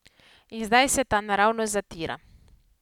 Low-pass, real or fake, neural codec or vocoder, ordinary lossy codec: 19.8 kHz; real; none; none